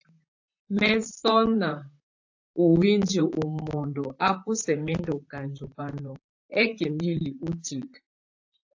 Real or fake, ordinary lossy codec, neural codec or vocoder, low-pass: fake; AAC, 48 kbps; vocoder, 44.1 kHz, 128 mel bands, Pupu-Vocoder; 7.2 kHz